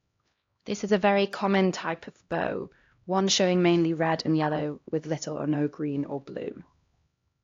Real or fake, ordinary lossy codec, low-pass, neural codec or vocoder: fake; AAC, 48 kbps; 7.2 kHz; codec, 16 kHz, 1 kbps, X-Codec, HuBERT features, trained on LibriSpeech